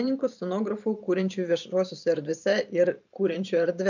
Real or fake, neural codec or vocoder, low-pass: fake; vocoder, 44.1 kHz, 128 mel bands every 512 samples, BigVGAN v2; 7.2 kHz